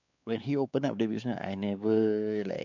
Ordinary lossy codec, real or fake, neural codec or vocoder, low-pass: none; fake; codec, 16 kHz, 4 kbps, X-Codec, WavLM features, trained on Multilingual LibriSpeech; 7.2 kHz